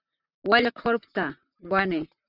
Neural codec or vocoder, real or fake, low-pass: vocoder, 44.1 kHz, 128 mel bands, Pupu-Vocoder; fake; 5.4 kHz